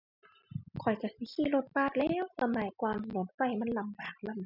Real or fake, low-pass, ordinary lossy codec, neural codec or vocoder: real; 5.4 kHz; none; none